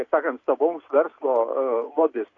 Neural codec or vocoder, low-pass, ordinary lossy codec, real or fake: none; 7.2 kHz; AAC, 32 kbps; real